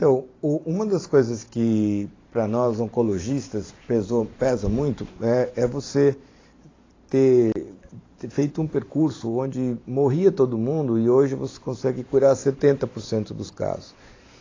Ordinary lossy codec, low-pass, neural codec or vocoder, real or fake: AAC, 32 kbps; 7.2 kHz; vocoder, 44.1 kHz, 128 mel bands every 512 samples, BigVGAN v2; fake